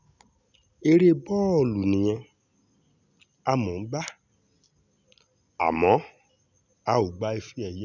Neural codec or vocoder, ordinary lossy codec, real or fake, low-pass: none; none; real; 7.2 kHz